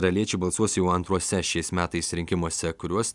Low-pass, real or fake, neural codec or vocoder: 10.8 kHz; real; none